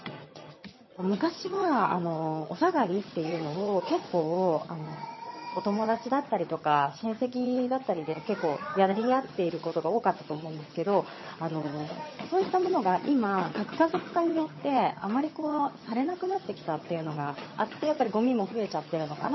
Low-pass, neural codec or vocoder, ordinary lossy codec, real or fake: 7.2 kHz; vocoder, 22.05 kHz, 80 mel bands, HiFi-GAN; MP3, 24 kbps; fake